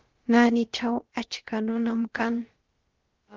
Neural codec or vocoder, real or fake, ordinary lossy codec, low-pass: codec, 16 kHz, about 1 kbps, DyCAST, with the encoder's durations; fake; Opus, 16 kbps; 7.2 kHz